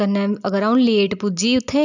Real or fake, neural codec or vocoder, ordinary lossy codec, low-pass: real; none; none; 7.2 kHz